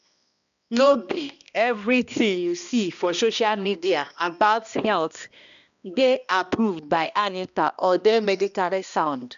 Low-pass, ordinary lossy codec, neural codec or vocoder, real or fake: 7.2 kHz; none; codec, 16 kHz, 1 kbps, X-Codec, HuBERT features, trained on balanced general audio; fake